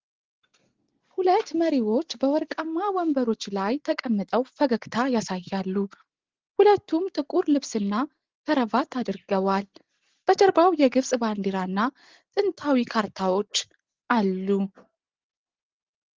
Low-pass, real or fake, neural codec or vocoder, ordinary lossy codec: 7.2 kHz; real; none; Opus, 16 kbps